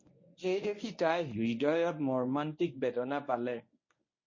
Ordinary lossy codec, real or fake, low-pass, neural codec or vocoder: MP3, 32 kbps; fake; 7.2 kHz; codec, 24 kHz, 0.9 kbps, WavTokenizer, medium speech release version 1